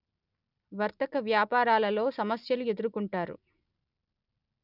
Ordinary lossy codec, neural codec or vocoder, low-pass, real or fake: none; none; 5.4 kHz; real